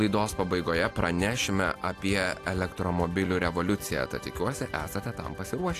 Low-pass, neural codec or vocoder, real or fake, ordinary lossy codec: 14.4 kHz; none; real; AAC, 64 kbps